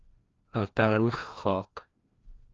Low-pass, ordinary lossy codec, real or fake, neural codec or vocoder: 7.2 kHz; Opus, 32 kbps; fake; codec, 16 kHz, 1 kbps, FreqCodec, larger model